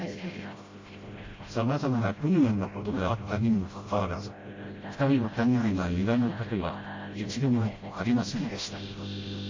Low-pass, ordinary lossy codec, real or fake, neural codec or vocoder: 7.2 kHz; MP3, 32 kbps; fake; codec, 16 kHz, 0.5 kbps, FreqCodec, smaller model